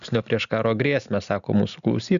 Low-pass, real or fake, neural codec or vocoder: 7.2 kHz; real; none